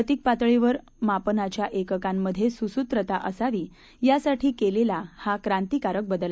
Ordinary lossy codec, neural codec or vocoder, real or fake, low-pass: none; none; real; none